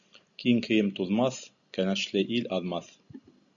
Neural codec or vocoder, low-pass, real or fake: none; 7.2 kHz; real